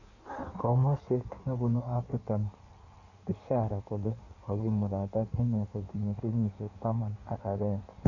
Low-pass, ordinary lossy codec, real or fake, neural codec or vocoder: 7.2 kHz; none; fake; codec, 16 kHz in and 24 kHz out, 1.1 kbps, FireRedTTS-2 codec